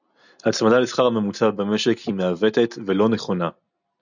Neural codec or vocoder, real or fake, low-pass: none; real; 7.2 kHz